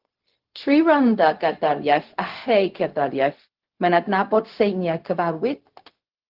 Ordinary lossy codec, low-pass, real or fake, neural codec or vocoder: Opus, 16 kbps; 5.4 kHz; fake; codec, 16 kHz, 0.4 kbps, LongCat-Audio-Codec